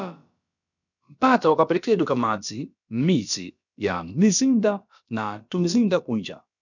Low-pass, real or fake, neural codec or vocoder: 7.2 kHz; fake; codec, 16 kHz, about 1 kbps, DyCAST, with the encoder's durations